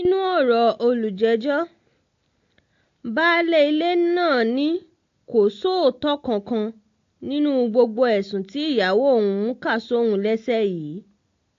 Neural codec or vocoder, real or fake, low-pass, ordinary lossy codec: none; real; 7.2 kHz; AAC, 48 kbps